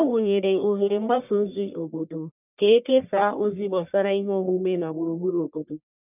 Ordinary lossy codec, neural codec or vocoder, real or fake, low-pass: none; codec, 44.1 kHz, 1.7 kbps, Pupu-Codec; fake; 3.6 kHz